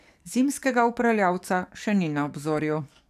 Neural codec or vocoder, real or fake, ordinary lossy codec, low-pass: codec, 44.1 kHz, 7.8 kbps, DAC; fake; none; 14.4 kHz